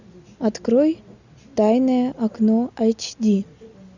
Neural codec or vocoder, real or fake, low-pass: none; real; 7.2 kHz